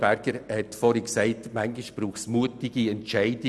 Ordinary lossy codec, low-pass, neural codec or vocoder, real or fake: none; none; none; real